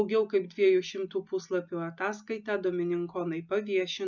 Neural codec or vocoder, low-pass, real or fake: none; 7.2 kHz; real